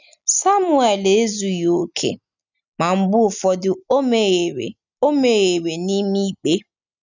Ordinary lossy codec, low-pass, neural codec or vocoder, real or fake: none; 7.2 kHz; none; real